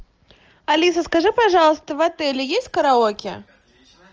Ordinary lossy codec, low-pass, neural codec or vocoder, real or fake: Opus, 24 kbps; 7.2 kHz; none; real